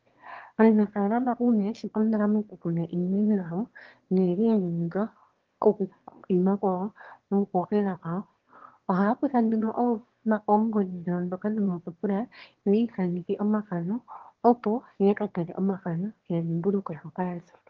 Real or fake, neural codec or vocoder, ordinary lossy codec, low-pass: fake; autoencoder, 22.05 kHz, a latent of 192 numbers a frame, VITS, trained on one speaker; Opus, 16 kbps; 7.2 kHz